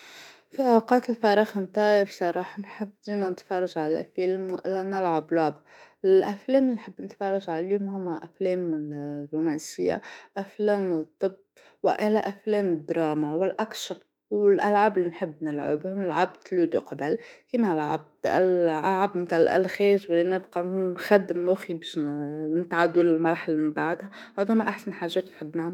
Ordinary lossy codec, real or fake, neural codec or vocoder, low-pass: none; fake; autoencoder, 48 kHz, 32 numbers a frame, DAC-VAE, trained on Japanese speech; 19.8 kHz